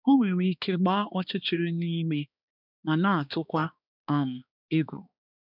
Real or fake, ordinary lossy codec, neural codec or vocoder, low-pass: fake; none; codec, 16 kHz, 2 kbps, X-Codec, HuBERT features, trained on balanced general audio; 5.4 kHz